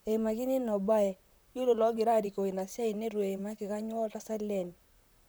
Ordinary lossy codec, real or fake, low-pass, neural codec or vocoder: none; fake; none; vocoder, 44.1 kHz, 128 mel bands, Pupu-Vocoder